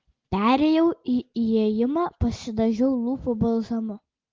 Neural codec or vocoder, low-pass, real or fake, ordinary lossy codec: none; 7.2 kHz; real; Opus, 16 kbps